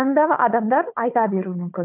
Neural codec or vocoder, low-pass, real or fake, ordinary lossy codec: codec, 16 kHz, 4 kbps, FunCodec, trained on Chinese and English, 50 frames a second; 3.6 kHz; fake; none